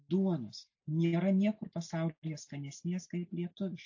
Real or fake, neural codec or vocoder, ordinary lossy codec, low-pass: real; none; AAC, 48 kbps; 7.2 kHz